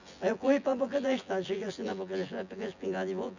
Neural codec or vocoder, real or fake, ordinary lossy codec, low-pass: vocoder, 24 kHz, 100 mel bands, Vocos; fake; none; 7.2 kHz